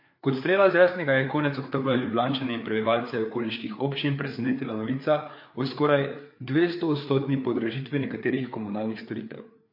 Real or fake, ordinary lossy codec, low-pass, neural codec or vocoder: fake; MP3, 32 kbps; 5.4 kHz; codec, 16 kHz, 4 kbps, FreqCodec, larger model